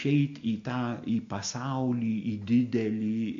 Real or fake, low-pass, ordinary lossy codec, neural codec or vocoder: real; 7.2 kHz; MP3, 48 kbps; none